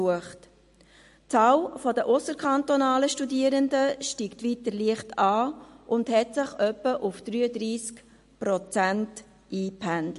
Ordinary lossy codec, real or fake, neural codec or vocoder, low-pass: MP3, 48 kbps; real; none; 14.4 kHz